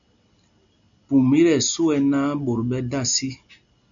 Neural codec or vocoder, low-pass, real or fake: none; 7.2 kHz; real